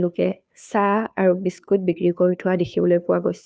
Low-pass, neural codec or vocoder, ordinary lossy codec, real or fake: none; codec, 16 kHz, 2 kbps, FunCodec, trained on Chinese and English, 25 frames a second; none; fake